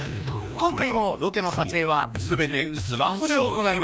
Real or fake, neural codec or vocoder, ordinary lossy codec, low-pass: fake; codec, 16 kHz, 1 kbps, FreqCodec, larger model; none; none